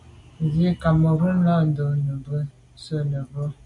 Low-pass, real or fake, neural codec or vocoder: 10.8 kHz; real; none